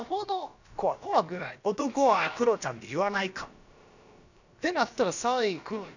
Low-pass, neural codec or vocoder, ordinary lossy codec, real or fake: 7.2 kHz; codec, 16 kHz, about 1 kbps, DyCAST, with the encoder's durations; none; fake